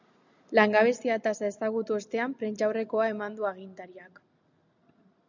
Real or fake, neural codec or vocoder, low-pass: real; none; 7.2 kHz